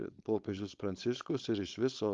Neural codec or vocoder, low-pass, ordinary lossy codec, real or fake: codec, 16 kHz, 4.8 kbps, FACodec; 7.2 kHz; Opus, 32 kbps; fake